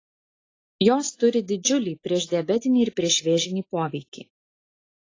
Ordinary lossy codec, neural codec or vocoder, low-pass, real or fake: AAC, 32 kbps; none; 7.2 kHz; real